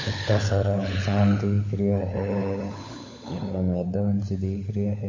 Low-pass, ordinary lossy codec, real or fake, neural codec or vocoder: 7.2 kHz; MP3, 32 kbps; fake; codec, 16 kHz, 16 kbps, FunCodec, trained on LibriTTS, 50 frames a second